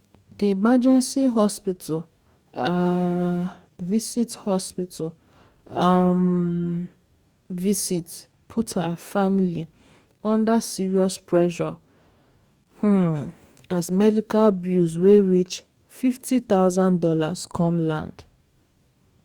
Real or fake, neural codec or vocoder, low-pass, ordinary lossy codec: fake; codec, 44.1 kHz, 2.6 kbps, DAC; 19.8 kHz; Opus, 64 kbps